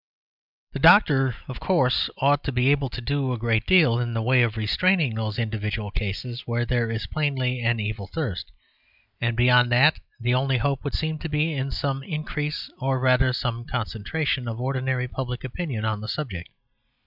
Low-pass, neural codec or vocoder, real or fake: 5.4 kHz; none; real